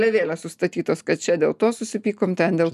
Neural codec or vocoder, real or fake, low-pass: none; real; 14.4 kHz